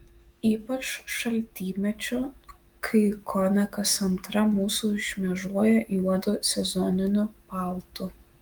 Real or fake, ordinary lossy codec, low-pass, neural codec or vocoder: fake; Opus, 32 kbps; 19.8 kHz; autoencoder, 48 kHz, 128 numbers a frame, DAC-VAE, trained on Japanese speech